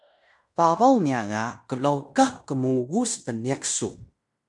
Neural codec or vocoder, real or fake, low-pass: codec, 16 kHz in and 24 kHz out, 0.9 kbps, LongCat-Audio-Codec, fine tuned four codebook decoder; fake; 10.8 kHz